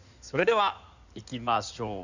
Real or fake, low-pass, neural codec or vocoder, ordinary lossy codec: fake; 7.2 kHz; codec, 16 kHz in and 24 kHz out, 2.2 kbps, FireRedTTS-2 codec; none